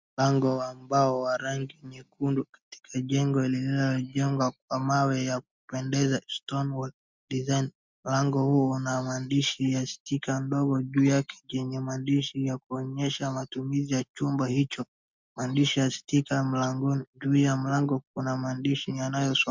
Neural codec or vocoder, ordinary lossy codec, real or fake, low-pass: none; MP3, 64 kbps; real; 7.2 kHz